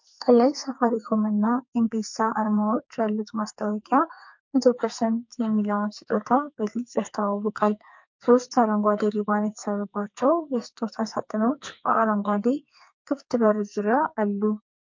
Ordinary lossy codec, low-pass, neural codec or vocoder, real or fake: MP3, 48 kbps; 7.2 kHz; codec, 44.1 kHz, 2.6 kbps, SNAC; fake